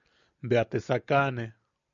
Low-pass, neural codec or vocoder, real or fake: 7.2 kHz; none; real